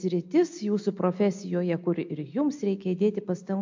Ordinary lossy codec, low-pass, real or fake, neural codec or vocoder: MP3, 48 kbps; 7.2 kHz; real; none